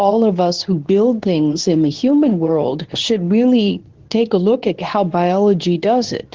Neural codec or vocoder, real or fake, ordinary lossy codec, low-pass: codec, 24 kHz, 0.9 kbps, WavTokenizer, medium speech release version 2; fake; Opus, 16 kbps; 7.2 kHz